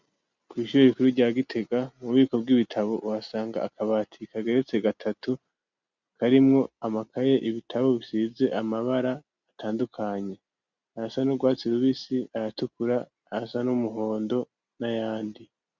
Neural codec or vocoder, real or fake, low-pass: none; real; 7.2 kHz